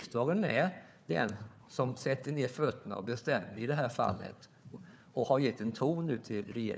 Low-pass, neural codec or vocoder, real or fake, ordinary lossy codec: none; codec, 16 kHz, 4 kbps, FunCodec, trained on Chinese and English, 50 frames a second; fake; none